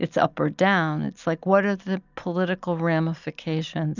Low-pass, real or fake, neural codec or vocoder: 7.2 kHz; real; none